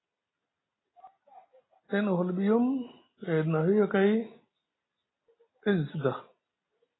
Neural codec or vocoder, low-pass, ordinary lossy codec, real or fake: none; 7.2 kHz; AAC, 16 kbps; real